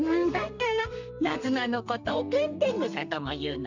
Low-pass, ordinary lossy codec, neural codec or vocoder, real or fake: 7.2 kHz; none; codec, 32 kHz, 1.9 kbps, SNAC; fake